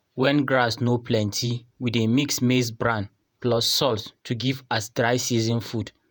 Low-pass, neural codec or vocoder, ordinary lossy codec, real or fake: none; vocoder, 48 kHz, 128 mel bands, Vocos; none; fake